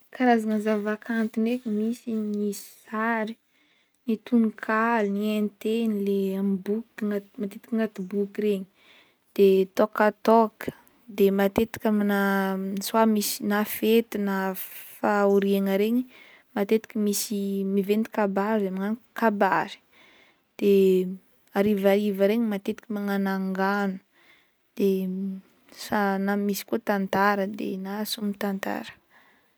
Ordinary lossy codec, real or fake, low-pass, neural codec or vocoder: none; real; none; none